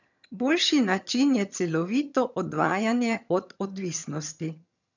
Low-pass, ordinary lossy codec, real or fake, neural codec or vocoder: 7.2 kHz; none; fake; vocoder, 22.05 kHz, 80 mel bands, HiFi-GAN